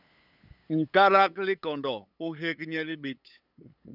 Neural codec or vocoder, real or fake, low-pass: codec, 16 kHz, 8 kbps, FunCodec, trained on LibriTTS, 25 frames a second; fake; 5.4 kHz